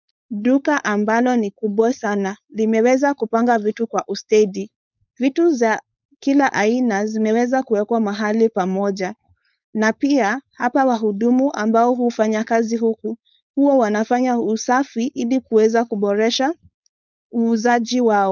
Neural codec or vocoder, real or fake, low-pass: codec, 16 kHz, 4.8 kbps, FACodec; fake; 7.2 kHz